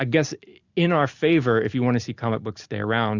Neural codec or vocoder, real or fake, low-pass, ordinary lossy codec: none; real; 7.2 kHz; Opus, 64 kbps